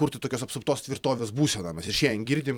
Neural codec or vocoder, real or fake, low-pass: vocoder, 44.1 kHz, 128 mel bands every 256 samples, BigVGAN v2; fake; 19.8 kHz